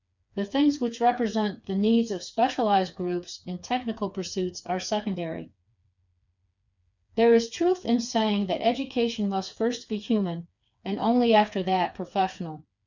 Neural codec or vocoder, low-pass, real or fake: codec, 16 kHz, 4 kbps, FreqCodec, smaller model; 7.2 kHz; fake